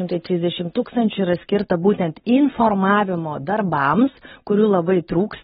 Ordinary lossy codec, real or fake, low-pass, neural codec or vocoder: AAC, 16 kbps; real; 7.2 kHz; none